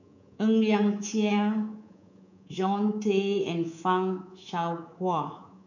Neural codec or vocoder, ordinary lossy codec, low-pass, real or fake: codec, 24 kHz, 3.1 kbps, DualCodec; none; 7.2 kHz; fake